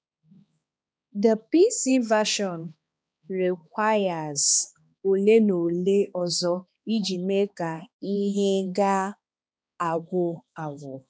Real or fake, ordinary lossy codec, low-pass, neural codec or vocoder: fake; none; none; codec, 16 kHz, 2 kbps, X-Codec, HuBERT features, trained on balanced general audio